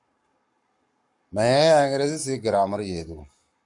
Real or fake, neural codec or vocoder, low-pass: fake; codec, 44.1 kHz, 7.8 kbps, Pupu-Codec; 10.8 kHz